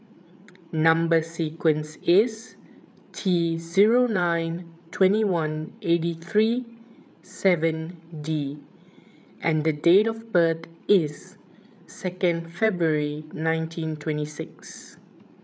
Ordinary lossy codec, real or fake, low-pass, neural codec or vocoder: none; fake; none; codec, 16 kHz, 8 kbps, FreqCodec, larger model